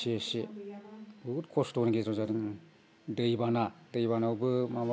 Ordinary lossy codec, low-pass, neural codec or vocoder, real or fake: none; none; none; real